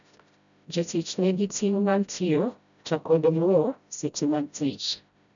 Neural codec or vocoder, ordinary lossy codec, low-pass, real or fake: codec, 16 kHz, 0.5 kbps, FreqCodec, smaller model; none; 7.2 kHz; fake